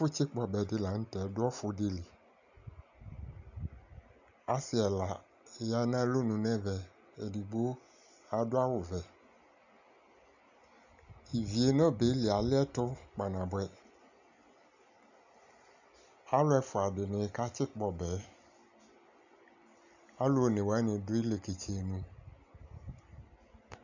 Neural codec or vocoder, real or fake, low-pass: none; real; 7.2 kHz